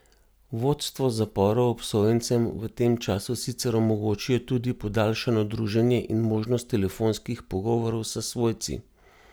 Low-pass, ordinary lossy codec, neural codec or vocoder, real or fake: none; none; none; real